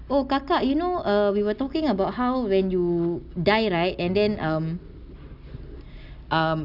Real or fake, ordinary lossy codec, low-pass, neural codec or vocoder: real; none; 5.4 kHz; none